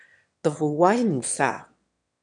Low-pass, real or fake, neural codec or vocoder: 9.9 kHz; fake; autoencoder, 22.05 kHz, a latent of 192 numbers a frame, VITS, trained on one speaker